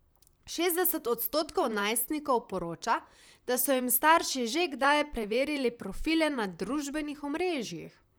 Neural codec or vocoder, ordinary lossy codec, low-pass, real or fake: vocoder, 44.1 kHz, 128 mel bands, Pupu-Vocoder; none; none; fake